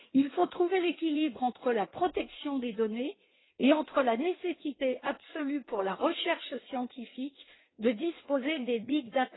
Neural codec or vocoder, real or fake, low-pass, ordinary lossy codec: codec, 16 kHz in and 24 kHz out, 1.1 kbps, FireRedTTS-2 codec; fake; 7.2 kHz; AAC, 16 kbps